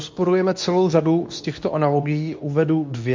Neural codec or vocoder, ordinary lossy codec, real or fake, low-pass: codec, 24 kHz, 0.9 kbps, WavTokenizer, medium speech release version 2; MP3, 48 kbps; fake; 7.2 kHz